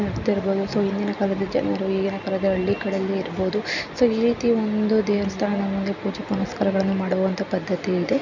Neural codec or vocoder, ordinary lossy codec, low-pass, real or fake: none; none; 7.2 kHz; real